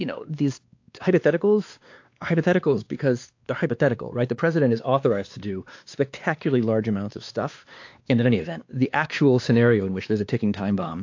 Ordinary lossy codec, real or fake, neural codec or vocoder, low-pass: AAC, 48 kbps; fake; codec, 16 kHz, 2 kbps, X-Codec, WavLM features, trained on Multilingual LibriSpeech; 7.2 kHz